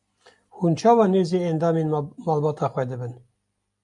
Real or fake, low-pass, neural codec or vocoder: real; 10.8 kHz; none